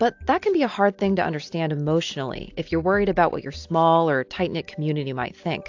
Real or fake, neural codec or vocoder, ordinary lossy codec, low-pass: real; none; AAC, 48 kbps; 7.2 kHz